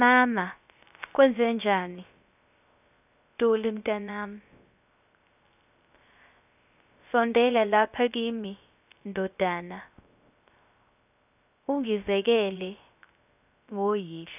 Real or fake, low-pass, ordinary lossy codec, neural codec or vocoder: fake; 3.6 kHz; none; codec, 16 kHz, 0.3 kbps, FocalCodec